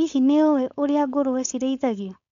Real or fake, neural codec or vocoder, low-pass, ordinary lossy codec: fake; codec, 16 kHz, 4.8 kbps, FACodec; 7.2 kHz; none